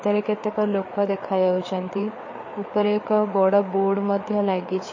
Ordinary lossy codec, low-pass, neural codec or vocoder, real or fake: MP3, 32 kbps; 7.2 kHz; codec, 16 kHz, 4 kbps, FreqCodec, larger model; fake